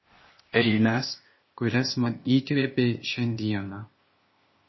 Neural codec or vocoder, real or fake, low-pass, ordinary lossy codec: codec, 16 kHz, 0.8 kbps, ZipCodec; fake; 7.2 kHz; MP3, 24 kbps